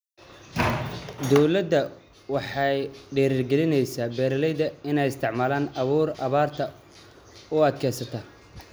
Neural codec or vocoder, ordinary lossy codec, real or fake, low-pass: none; none; real; none